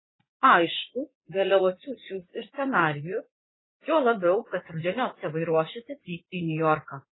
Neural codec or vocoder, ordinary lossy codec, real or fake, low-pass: vocoder, 44.1 kHz, 80 mel bands, Vocos; AAC, 16 kbps; fake; 7.2 kHz